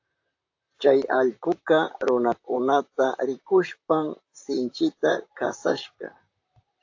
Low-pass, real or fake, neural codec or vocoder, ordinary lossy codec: 7.2 kHz; fake; vocoder, 44.1 kHz, 128 mel bands, Pupu-Vocoder; AAC, 48 kbps